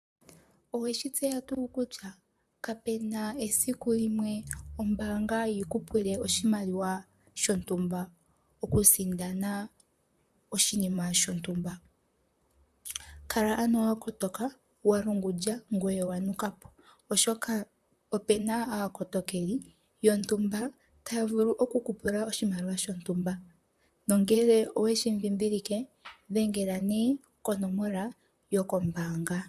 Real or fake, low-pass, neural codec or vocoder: fake; 14.4 kHz; vocoder, 44.1 kHz, 128 mel bands, Pupu-Vocoder